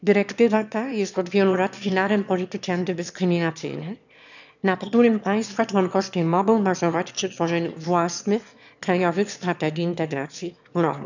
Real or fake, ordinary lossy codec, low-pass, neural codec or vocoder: fake; none; 7.2 kHz; autoencoder, 22.05 kHz, a latent of 192 numbers a frame, VITS, trained on one speaker